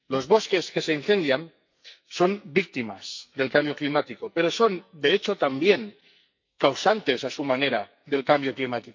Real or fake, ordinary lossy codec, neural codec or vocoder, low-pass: fake; MP3, 64 kbps; codec, 44.1 kHz, 2.6 kbps, SNAC; 7.2 kHz